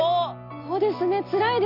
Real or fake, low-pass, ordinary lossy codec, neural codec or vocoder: real; 5.4 kHz; none; none